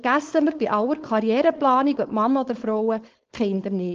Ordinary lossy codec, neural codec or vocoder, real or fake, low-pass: Opus, 16 kbps; codec, 16 kHz, 4.8 kbps, FACodec; fake; 7.2 kHz